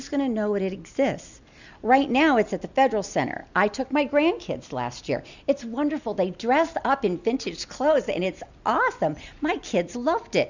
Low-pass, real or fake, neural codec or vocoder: 7.2 kHz; real; none